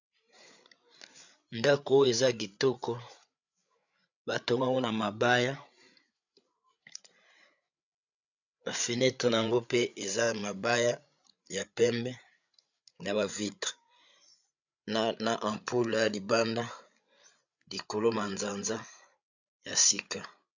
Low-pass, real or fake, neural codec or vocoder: 7.2 kHz; fake; codec, 16 kHz, 8 kbps, FreqCodec, larger model